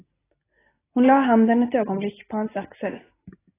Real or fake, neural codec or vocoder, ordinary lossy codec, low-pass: real; none; AAC, 16 kbps; 3.6 kHz